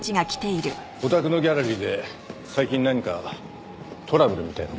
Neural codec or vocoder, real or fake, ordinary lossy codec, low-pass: none; real; none; none